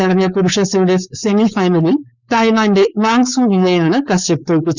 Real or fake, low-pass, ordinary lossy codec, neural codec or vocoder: fake; 7.2 kHz; none; codec, 16 kHz, 4.8 kbps, FACodec